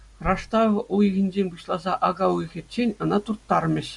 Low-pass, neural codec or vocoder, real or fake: 10.8 kHz; none; real